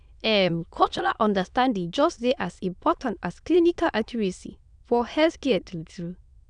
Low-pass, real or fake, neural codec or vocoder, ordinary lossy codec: 9.9 kHz; fake; autoencoder, 22.05 kHz, a latent of 192 numbers a frame, VITS, trained on many speakers; none